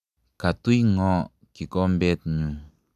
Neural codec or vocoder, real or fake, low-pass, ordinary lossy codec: none; real; 14.4 kHz; none